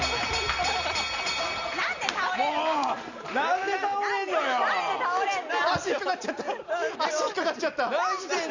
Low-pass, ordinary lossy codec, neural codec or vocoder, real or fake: 7.2 kHz; Opus, 64 kbps; none; real